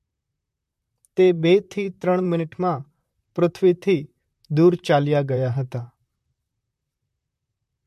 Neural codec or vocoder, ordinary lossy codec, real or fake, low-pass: vocoder, 44.1 kHz, 128 mel bands, Pupu-Vocoder; MP3, 64 kbps; fake; 14.4 kHz